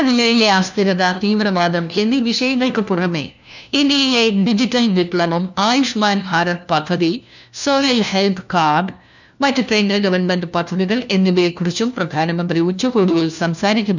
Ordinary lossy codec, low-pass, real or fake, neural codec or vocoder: none; 7.2 kHz; fake; codec, 16 kHz, 1 kbps, FunCodec, trained on LibriTTS, 50 frames a second